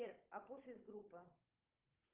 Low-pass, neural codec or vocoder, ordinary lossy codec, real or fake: 3.6 kHz; codec, 16 kHz, 16 kbps, FunCodec, trained on Chinese and English, 50 frames a second; Opus, 32 kbps; fake